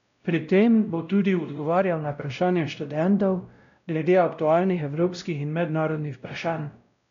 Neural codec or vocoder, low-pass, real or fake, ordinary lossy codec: codec, 16 kHz, 0.5 kbps, X-Codec, WavLM features, trained on Multilingual LibriSpeech; 7.2 kHz; fake; none